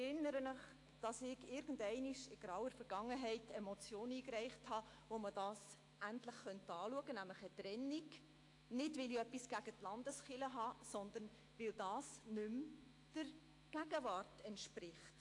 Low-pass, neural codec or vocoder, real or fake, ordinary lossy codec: 10.8 kHz; autoencoder, 48 kHz, 128 numbers a frame, DAC-VAE, trained on Japanese speech; fake; AAC, 48 kbps